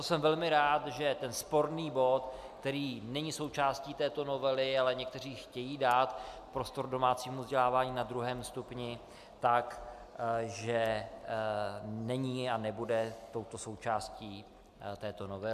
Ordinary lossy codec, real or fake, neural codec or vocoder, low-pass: MP3, 96 kbps; real; none; 14.4 kHz